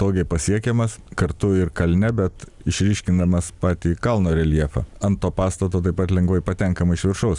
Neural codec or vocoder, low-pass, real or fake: none; 10.8 kHz; real